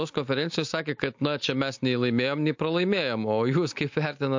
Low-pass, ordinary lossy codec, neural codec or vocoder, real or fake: 7.2 kHz; MP3, 48 kbps; none; real